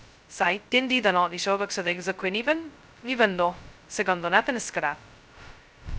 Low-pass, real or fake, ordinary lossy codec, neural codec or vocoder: none; fake; none; codec, 16 kHz, 0.2 kbps, FocalCodec